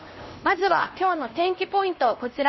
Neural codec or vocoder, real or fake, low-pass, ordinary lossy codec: codec, 16 kHz, 1 kbps, X-Codec, HuBERT features, trained on LibriSpeech; fake; 7.2 kHz; MP3, 24 kbps